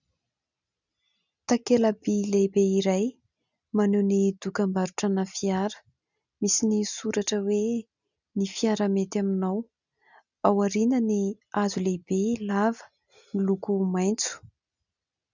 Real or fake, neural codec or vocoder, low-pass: real; none; 7.2 kHz